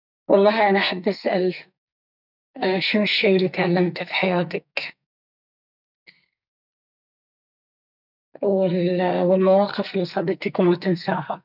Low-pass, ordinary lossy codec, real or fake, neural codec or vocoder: 5.4 kHz; none; fake; codec, 44.1 kHz, 2.6 kbps, SNAC